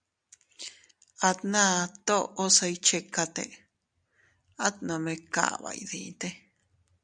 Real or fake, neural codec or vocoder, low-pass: real; none; 9.9 kHz